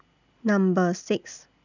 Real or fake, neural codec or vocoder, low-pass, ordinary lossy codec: real; none; 7.2 kHz; none